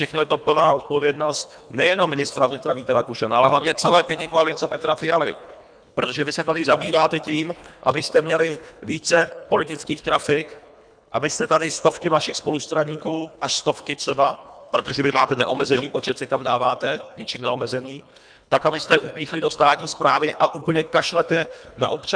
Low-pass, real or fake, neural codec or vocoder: 9.9 kHz; fake; codec, 24 kHz, 1.5 kbps, HILCodec